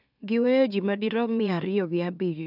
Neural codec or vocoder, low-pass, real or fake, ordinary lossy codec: autoencoder, 44.1 kHz, a latent of 192 numbers a frame, MeloTTS; 5.4 kHz; fake; none